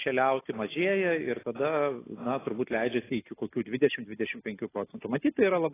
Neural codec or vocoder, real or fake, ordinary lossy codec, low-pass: none; real; AAC, 16 kbps; 3.6 kHz